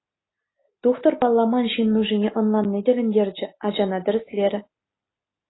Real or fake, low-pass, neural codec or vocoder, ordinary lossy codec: real; 7.2 kHz; none; AAC, 16 kbps